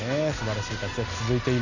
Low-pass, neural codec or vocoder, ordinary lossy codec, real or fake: 7.2 kHz; none; none; real